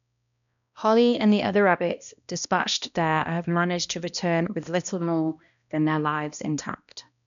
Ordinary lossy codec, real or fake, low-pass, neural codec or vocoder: none; fake; 7.2 kHz; codec, 16 kHz, 1 kbps, X-Codec, HuBERT features, trained on balanced general audio